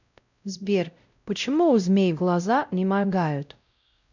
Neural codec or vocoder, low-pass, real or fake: codec, 16 kHz, 0.5 kbps, X-Codec, WavLM features, trained on Multilingual LibriSpeech; 7.2 kHz; fake